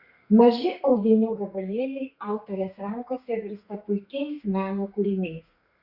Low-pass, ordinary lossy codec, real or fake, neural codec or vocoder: 5.4 kHz; Opus, 64 kbps; fake; codec, 32 kHz, 1.9 kbps, SNAC